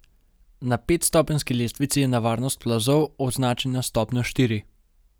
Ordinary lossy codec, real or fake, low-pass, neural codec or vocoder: none; real; none; none